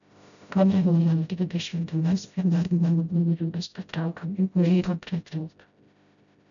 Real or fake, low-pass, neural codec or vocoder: fake; 7.2 kHz; codec, 16 kHz, 0.5 kbps, FreqCodec, smaller model